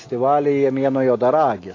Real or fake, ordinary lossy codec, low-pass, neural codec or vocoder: real; AAC, 32 kbps; 7.2 kHz; none